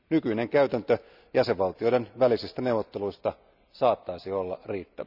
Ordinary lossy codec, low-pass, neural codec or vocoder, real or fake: none; 5.4 kHz; none; real